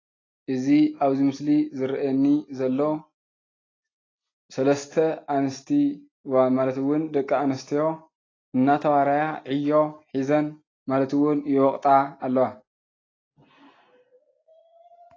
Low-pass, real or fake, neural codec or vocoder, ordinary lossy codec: 7.2 kHz; real; none; AAC, 32 kbps